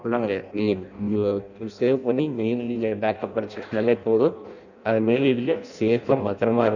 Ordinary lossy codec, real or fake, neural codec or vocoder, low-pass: none; fake; codec, 16 kHz in and 24 kHz out, 0.6 kbps, FireRedTTS-2 codec; 7.2 kHz